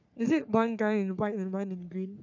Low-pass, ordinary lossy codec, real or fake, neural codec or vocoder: 7.2 kHz; none; fake; codec, 44.1 kHz, 3.4 kbps, Pupu-Codec